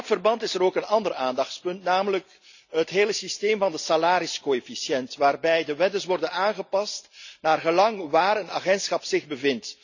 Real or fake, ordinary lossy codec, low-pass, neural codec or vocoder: real; none; 7.2 kHz; none